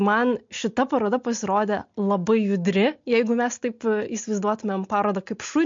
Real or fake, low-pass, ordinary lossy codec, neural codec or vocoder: real; 7.2 kHz; MP3, 48 kbps; none